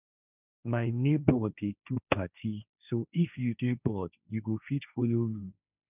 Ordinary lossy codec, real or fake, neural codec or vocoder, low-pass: none; fake; codec, 16 kHz, 1.1 kbps, Voila-Tokenizer; 3.6 kHz